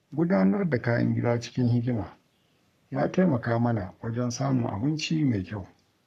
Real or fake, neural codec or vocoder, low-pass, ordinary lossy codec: fake; codec, 44.1 kHz, 3.4 kbps, Pupu-Codec; 14.4 kHz; none